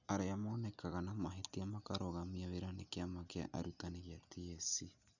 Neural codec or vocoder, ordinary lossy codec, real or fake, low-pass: none; none; real; 7.2 kHz